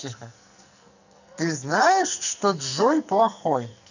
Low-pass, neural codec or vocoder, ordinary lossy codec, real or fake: 7.2 kHz; codec, 44.1 kHz, 2.6 kbps, SNAC; none; fake